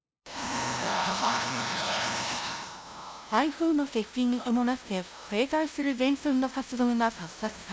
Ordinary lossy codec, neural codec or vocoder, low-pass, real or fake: none; codec, 16 kHz, 0.5 kbps, FunCodec, trained on LibriTTS, 25 frames a second; none; fake